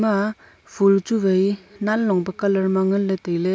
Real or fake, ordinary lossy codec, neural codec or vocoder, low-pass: real; none; none; none